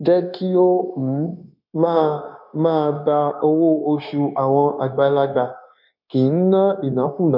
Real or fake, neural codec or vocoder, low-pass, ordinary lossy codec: fake; codec, 16 kHz, 0.9 kbps, LongCat-Audio-Codec; 5.4 kHz; MP3, 48 kbps